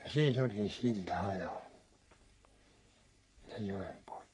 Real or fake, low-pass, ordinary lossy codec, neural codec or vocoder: fake; 10.8 kHz; MP3, 64 kbps; codec, 44.1 kHz, 3.4 kbps, Pupu-Codec